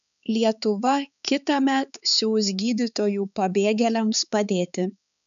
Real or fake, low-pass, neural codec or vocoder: fake; 7.2 kHz; codec, 16 kHz, 4 kbps, X-Codec, HuBERT features, trained on balanced general audio